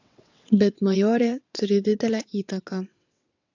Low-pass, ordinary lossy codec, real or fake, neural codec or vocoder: 7.2 kHz; AAC, 48 kbps; fake; vocoder, 22.05 kHz, 80 mel bands, WaveNeXt